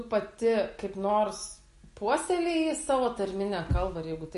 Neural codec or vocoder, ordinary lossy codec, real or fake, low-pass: none; MP3, 48 kbps; real; 14.4 kHz